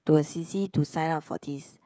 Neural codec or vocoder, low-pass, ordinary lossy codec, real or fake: codec, 16 kHz, 16 kbps, FreqCodec, smaller model; none; none; fake